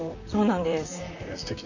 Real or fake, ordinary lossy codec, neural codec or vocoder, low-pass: fake; none; vocoder, 44.1 kHz, 128 mel bands, Pupu-Vocoder; 7.2 kHz